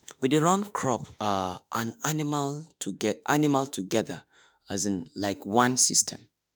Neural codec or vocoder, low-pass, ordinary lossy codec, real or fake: autoencoder, 48 kHz, 32 numbers a frame, DAC-VAE, trained on Japanese speech; none; none; fake